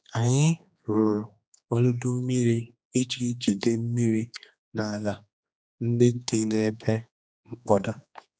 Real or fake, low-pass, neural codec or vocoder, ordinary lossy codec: fake; none; codec, 16 kHz, 2 kbps, X-Codec, HuBERT features, trained on general audio; none